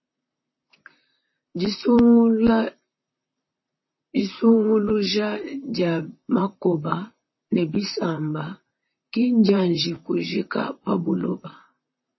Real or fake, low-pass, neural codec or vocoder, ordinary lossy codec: fake; 7.2 kHz; vocoder, 44.1 kHz, 128 mel bands, Pupu-Vocoder; MP3, 24 kbps